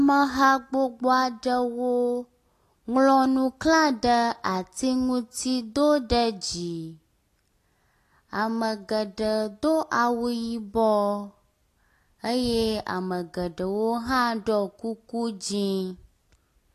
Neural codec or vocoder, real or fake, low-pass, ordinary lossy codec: none; real; 14.4 kHz; AAC, 48 kbps